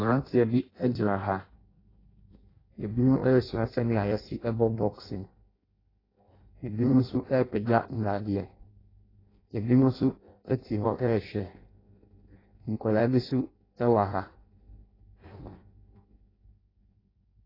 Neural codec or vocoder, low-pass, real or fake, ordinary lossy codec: codec, 16 kHz in and 24 kHz out, 0.6 kbps, FireRedTTS-2 codec; 5.4 kHz; fake; AAC, 24 kbps